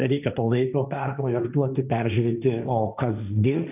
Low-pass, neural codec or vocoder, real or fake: 3.6 kHz; codec, 16 kHz, 1.1 kbps, Voila-Tokenizer; fake